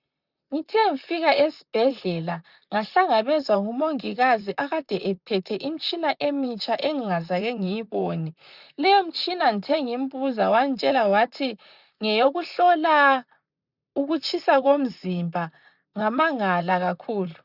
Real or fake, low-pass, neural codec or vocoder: fake; 5.4 kHz; vocoder, 44.1 kHz, 128 mel bands, Pupu-Vocoder